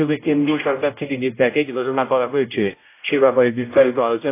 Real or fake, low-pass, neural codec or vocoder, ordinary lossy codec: fake; 3.6 kHz; codec, 16 kHz, 0.5 kbps, X-Codec, HuBERT features, trained on general audio; AAC, 24 kbps